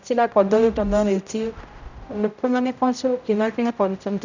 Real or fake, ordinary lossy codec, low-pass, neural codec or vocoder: fake; none; 7.2 kHz; codec, 16 kHz, 0.5 kbps, X-Codec, HuBERT features, trained on general audio